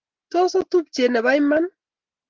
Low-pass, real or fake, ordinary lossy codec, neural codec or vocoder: 7.2 kHz; real; Opus, 16 kbps; none